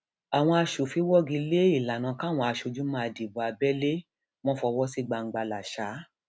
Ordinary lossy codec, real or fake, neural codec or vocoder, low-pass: none; real; none; none